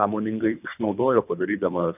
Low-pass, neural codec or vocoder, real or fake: 3.6 kHz; codec, 24 kHz, 3 kbps, HILCodec; fake